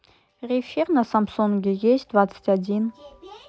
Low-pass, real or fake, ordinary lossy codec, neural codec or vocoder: none; real; none; none